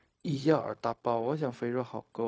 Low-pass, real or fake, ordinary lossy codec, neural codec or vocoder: none; fake; none; codec, 16 kHz, 0.4 kbps, LongCat-Audio-Codec